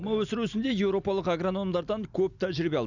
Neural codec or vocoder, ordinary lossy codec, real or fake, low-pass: none; none; real; 7.2 kHz